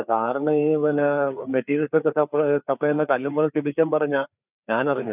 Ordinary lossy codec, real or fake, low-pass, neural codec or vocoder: none; fake; 3.6 kHz; codec, 16 kHz, 4 kbps, FreqCodec, larger model